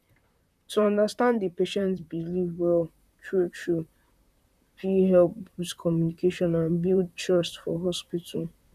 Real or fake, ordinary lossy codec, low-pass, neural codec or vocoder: fake; none; 14.4 kHz; vocoder, 44.1 kHz, 128 mel bands, Pupu-Vocoder